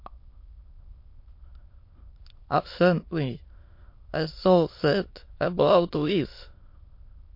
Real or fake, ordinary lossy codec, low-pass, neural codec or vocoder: fake; MP3, 32 kbps; 5.4 kHz; autoencoder, 22.05 kHz, a latent of 192 numbers a frame, VITS, trained on many speakers